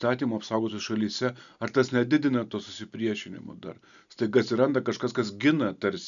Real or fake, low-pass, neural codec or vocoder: real; 7.2 kHz; none